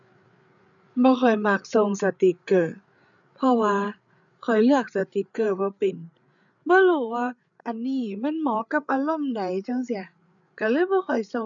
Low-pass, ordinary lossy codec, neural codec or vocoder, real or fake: 7.2 kHz; none; codec, 16 kHz, 8 kbps, FreqCodec, larger model; fake